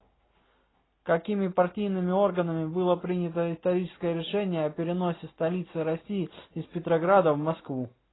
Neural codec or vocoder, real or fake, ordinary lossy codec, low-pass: none; real; AAC, 16 kbps; 7.2 kHz